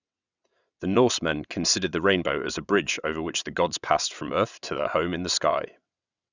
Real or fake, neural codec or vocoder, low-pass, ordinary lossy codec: fake; vocoder, 22.05 kHz, 80 mel bands, WaveNeXt; 7.2 kHz; none